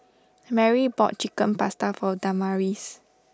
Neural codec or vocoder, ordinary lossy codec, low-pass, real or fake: none; none; none; real